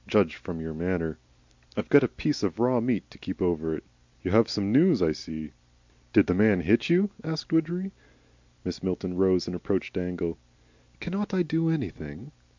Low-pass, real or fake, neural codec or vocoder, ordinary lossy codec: 7.2 kHz; real; none; MP3, 64 kbps